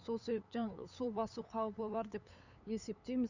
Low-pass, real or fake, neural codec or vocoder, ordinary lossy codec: 7.2 kHz; fake; codec, 16 kHz, 8 kbps, FunCodec, trained on LibriTTS, 25 frames a second; none